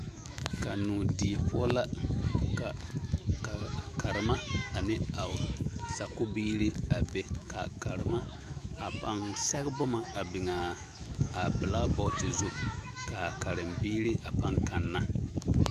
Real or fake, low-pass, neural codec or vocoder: fake; 14.4 kHz; autoencoder, 48 kHz, 128 numbers a frame, DAC-VAE, trained on Japanese speech